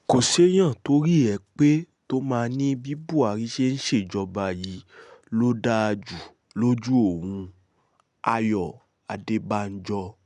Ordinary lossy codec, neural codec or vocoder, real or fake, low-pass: none; none; real; 10.8 kHz